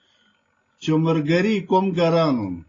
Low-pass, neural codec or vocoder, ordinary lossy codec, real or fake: 7.2 kHz; none; AAC, 32 kbps; real